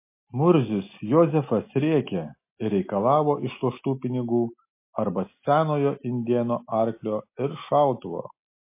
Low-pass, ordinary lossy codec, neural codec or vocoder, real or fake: 3.6 kHz; MP3, 24 kbps; none; real